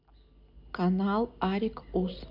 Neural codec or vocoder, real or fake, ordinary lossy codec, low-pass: none; real; MP3, 48 kbps; 5.4 kHz